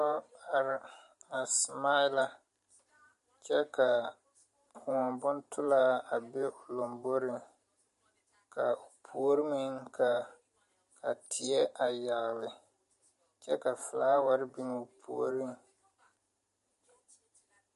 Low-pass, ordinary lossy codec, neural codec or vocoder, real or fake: 14.4 kHz; MP3, 48 kbps; vocoder, 44.1 kHz, 128 mel bands every 512 samples, BigVGAN v2; fake